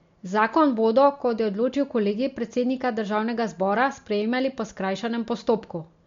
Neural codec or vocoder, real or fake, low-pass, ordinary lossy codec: none; real; 7.2 kHz; MP3, 48 kbps